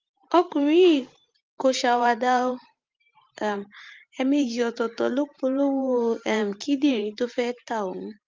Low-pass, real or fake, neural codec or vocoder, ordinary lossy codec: 7.2 kHz; fake; vocoder, 44.1 kHz, 128 mel bands every 512 samples, BigVGAN v2; Opus, 32 kbps